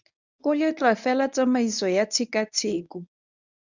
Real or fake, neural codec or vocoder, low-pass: fake; codec, 24 kHz, 0.9 kbps, WavTokenizer, medium speech release version 1; 7.2 kHz